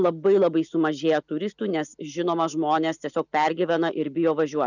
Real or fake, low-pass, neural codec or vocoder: real; 7.2 kHz; none